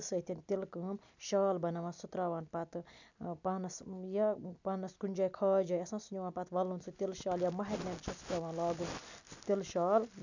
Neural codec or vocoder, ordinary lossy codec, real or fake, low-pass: none; none; real; 7.2 kHz